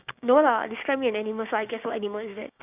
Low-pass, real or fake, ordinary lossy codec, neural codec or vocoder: 3.6 kHz; fake; none; codec, 16 kHz, 6 kbps, DAC